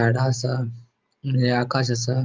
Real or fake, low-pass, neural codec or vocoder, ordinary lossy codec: real; 7.2 kHz; none; Opus, 24 kbps